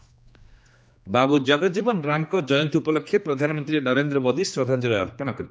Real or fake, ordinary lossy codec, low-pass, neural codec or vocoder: fake; none; none; codec, 16 kHz, 2 kbps, X-Codec, HuBERT features, trained on general audio